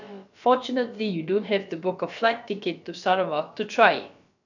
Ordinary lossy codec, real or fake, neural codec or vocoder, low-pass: none; fake; codec, 16 kHz, about 1 kbps, DyCAST, with the encoder's durations; 7.2 kHz